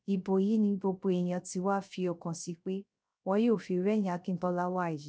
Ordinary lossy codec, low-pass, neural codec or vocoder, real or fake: none; none; codec, 16 kHz, 0.3 kbps, FocalCodec; fake